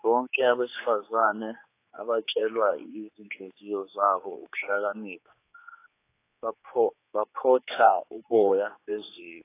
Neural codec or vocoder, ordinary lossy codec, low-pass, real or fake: codec, 16 kHz, 4 kbps, X-Codec, HuBERT features, trained on general audio; AAC, 24 kbps; 3.6 kHz; fake